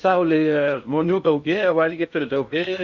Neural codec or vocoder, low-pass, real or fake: codec, 16 kHz in and 24 kHz out, 0.6 kbps, FocalCodec, streaming, 2048 codes; 7.2 kHz; fake